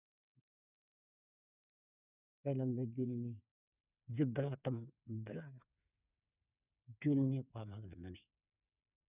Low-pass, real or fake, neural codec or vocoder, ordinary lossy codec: 3.6 kHz; fake; codec, 16 kHz, 8 kbps, FreqCodec, smaller model; none